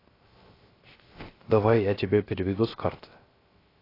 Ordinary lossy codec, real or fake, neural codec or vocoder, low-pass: AAC, 24 kbps; fake; codec, 16 kHz, 0.3 kbps, FocalCodec; 5.4 kHz